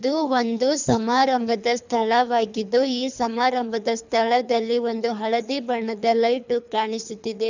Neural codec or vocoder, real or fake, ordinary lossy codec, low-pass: codec, 24 kHz, 3 kbps, HILCodec; fake; none; 7.2 kHz